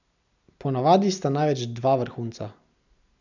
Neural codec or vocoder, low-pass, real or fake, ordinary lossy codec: none; 7.2 kHz; real; none